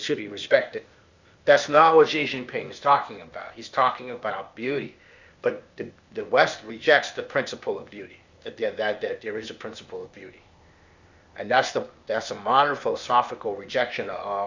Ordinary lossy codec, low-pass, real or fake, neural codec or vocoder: Opus, 64 kbps; 7.2 kHz; fake; codec, 16 kHz, 0.8 kbps, ZipCodec